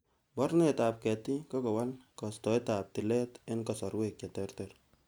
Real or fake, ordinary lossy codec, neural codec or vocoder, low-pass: real; none; none; none